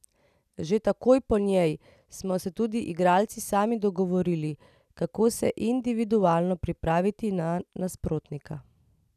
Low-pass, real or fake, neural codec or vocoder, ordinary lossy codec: 14.4 kHz; real; none; none